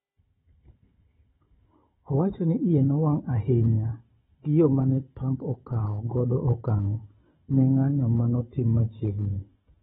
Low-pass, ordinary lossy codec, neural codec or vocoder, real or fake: 7.2 kHz; AAC, 16 kbps; codec, 16 kHz, 16 kbps, FunCodec, trained on Chinese and English, 50 frames a second; fake